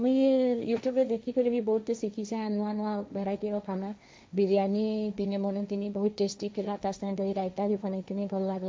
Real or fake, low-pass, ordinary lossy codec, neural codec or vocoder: fake; none; none; codec, 16 kHz, 1.1 kbps, Voila-Tokenizer